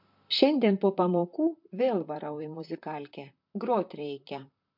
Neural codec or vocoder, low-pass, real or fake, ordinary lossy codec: vocoder, 44.1 kHz, 128 mel bands, Pupu-Vocoder; 5.4 kHz; fake; MP3, 48 kbps